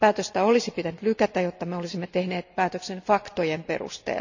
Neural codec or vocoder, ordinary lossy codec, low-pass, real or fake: none; none; 7.2 kHz; real